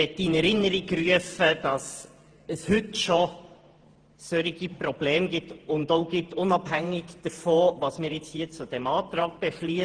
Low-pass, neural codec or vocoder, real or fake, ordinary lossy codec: 9.9 kHz; vocoder, 48 kHz, 128 mel bands, Vocos; fake; Opus, 16 kbps